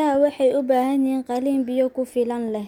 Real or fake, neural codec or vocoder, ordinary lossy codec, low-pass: real; none; none; 19.8 kHz